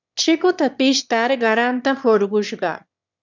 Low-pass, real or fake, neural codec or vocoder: 7.2 kHz; fake; autoencoder, 22.05 kHz, a latent of 192 numbers a frame, VITS, trained on one speaker